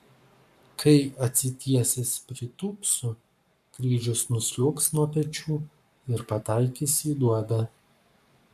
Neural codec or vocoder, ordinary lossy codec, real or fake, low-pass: codec, 44.1 kHz, 7.8 kbps, Pupu-Codec; MP3, 96 kbps; fake; 14.4 kHz